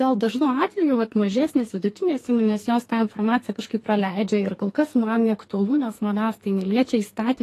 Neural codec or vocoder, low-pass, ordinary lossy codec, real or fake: codec, 44.1 kHz, 2.6 kbps, SNAC; 14.4 kHz; AAC, 48 kbps; fake